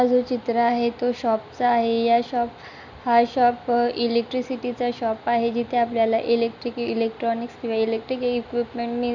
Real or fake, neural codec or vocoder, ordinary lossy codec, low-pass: real; none; none; 7.2 kHz